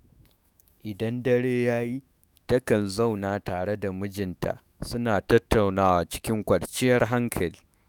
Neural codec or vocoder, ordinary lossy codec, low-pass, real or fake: autoencoder, 48 kHz, 128 numbers a frame, DAC-VAE, trained on Japanese speech; none; none; fake